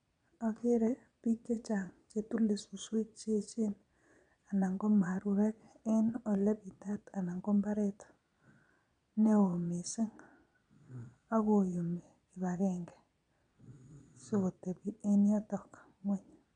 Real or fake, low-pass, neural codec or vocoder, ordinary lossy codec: fake; 9.9 kHz; vocoder, 22.05 kHz, 80 mel bands, Vocos; none